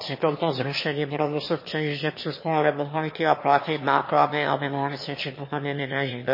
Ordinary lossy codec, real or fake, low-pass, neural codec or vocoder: MP3, 24 kbps; fake; 5.4 kHz; autoencoder, 22.05 kHz, a latent of 192 numbers a frame, VITS, trained on one speaker